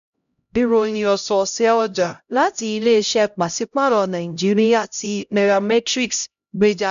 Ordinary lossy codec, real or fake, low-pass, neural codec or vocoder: none; fake; 7.2 kHz; codec, 16 kHz, 0.5 kbps, X-Codec, HuBERT features, trained on LibriSpeech